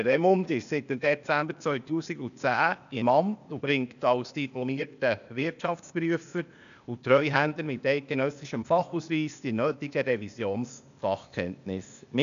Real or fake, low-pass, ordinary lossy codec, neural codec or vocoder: fake; 7.2 kHz; none; codec, 16 kHz, 0.8 kbps, ZipCodec